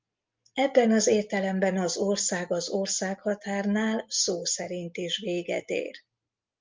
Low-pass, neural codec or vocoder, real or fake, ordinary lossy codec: 7.2 kHz; none; real; Opus, 32 kbps